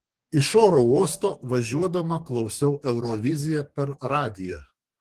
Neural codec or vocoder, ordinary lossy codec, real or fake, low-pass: codec, 44.1 kHz, 2.6 kbps, DAC; Opus, 24 kbps; fake; 14.4 kHz